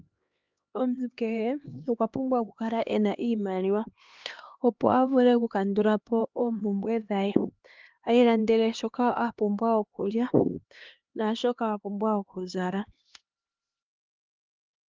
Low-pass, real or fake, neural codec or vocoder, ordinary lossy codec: 7.2 kHz; fake; codec, 16 kHz, 2 kbps, X-Codec, HuBERT features, trained on LibriSpeech; Opus, 32 kbps